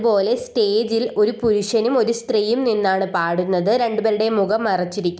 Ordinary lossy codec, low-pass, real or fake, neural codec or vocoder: none; none; real; none